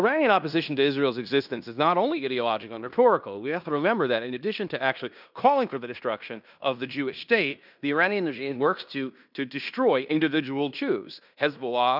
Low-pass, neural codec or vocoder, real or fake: 5.4 kHz; codec, 16 kHz in and 24 kHz out, 0.9 kbps, LongCat-Audio-Codec, fine tuned four codebook decoder; fake